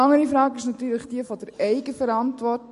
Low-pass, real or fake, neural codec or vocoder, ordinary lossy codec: 14.4 kHz; real; none; MP3, 48 kbps